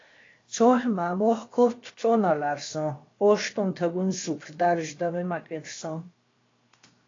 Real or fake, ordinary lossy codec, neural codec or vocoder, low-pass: fake; AAC, 32 kbps; codec, 16 kHz, 0.7 kbps, FocalCodec; 7.2 kHz